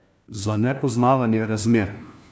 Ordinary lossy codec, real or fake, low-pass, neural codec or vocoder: none; fake; none; codec, 16 kHz, 1 kbps, FunCodec, trained on LibriTTS, 50 frames a second